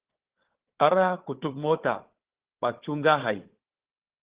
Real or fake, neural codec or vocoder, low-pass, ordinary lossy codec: fake; codec, 16 kHz, 4 kbps, FunCodec, trained on Chinese and English, 50 frames a second; 3.6 kHz; Opus, 16 kbps